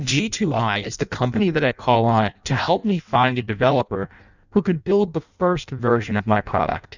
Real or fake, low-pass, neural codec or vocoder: fake; 7.2 kHz; codec, 16 kHz in and 24 kHz out, 0.6 kbps, FireRedTTS-2 codec